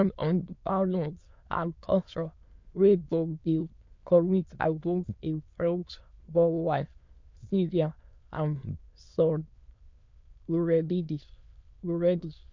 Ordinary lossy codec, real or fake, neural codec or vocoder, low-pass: MP3, 48 kbps; fake; autoencoder, 22.05 kHz, a latent of 192 numbers a frame, VITS, trained on many speakers; 7.2 kHz